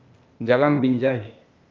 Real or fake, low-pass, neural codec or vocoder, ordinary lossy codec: fake; 7.2 kHz; codec, 16 kHz, 0.8 kbps, ZipCodec; Opus, 24 kbps